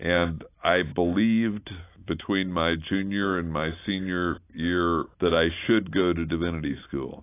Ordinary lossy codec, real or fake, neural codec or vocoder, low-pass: AAC, 24 kbps; real; none; 3.6 kHz